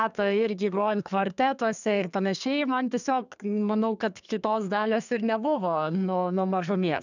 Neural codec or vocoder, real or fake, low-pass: codec, 32 kHz, 1.9 kbps, SNAC; fake; 7.2 kHz